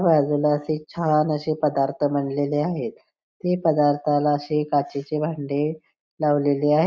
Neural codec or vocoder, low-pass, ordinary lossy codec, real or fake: none; none; none; real